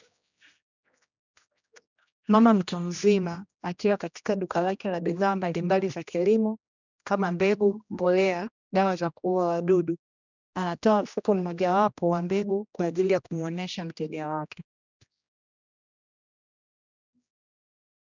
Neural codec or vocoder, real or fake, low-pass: codec, 16 kHz, 1 kbps, X-Codec, HuBERT features, trained on general audio; fake; 7.2 kHz